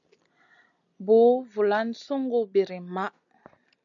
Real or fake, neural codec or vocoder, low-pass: real; none; 7.2 kHz